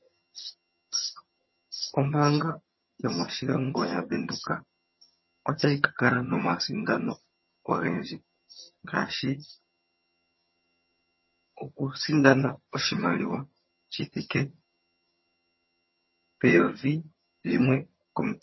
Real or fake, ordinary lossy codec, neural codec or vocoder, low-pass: fake; MP3, 24 kbps; vocoder, 22.05 kHz, 80 mel bands, HiFi-GAN; 7.2 kHz